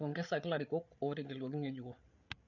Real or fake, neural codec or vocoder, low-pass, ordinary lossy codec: fake; codec, 16 kHz, 8 kbps, FreqCodec, larger model; 7.2 kHz; none